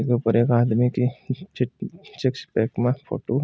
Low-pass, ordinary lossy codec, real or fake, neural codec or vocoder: none; none; real; none